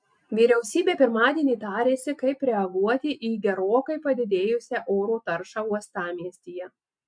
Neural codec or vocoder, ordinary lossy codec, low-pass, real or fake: none; MP3, 64 kbps; 9.9 kHz; real